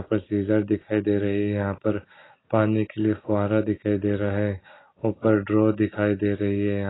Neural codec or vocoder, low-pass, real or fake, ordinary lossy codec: none; 7.2 kHz; real; AAC, 16 kbps